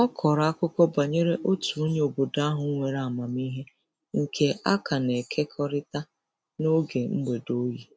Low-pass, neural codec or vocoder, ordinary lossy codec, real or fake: none; none; none; real